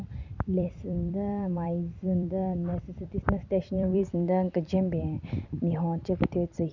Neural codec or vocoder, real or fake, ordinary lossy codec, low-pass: vocoder, 44.1 kHz, 128 mel bands every 256 samples, BigVGAN v2; fake; none; 7.2 kHz